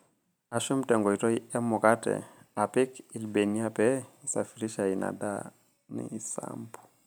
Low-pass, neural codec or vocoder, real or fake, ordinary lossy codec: none; none; real; none